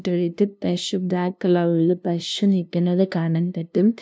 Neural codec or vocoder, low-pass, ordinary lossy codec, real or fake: codec, 16 kHz, 0.5 kbps, FunCodec, trained on LibriTTS, 25 frames a second; none; none; fake